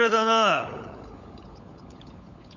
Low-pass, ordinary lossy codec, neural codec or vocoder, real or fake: 7.2 kHz; none; codec, 16 kHz, 16 kbps, FunCodec, trained on LibriTTS, 50 frames a second; fake